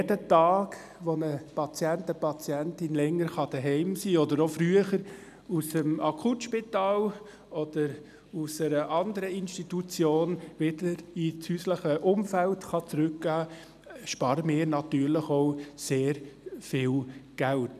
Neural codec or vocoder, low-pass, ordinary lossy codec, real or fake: none; 14.4 kHz; none; real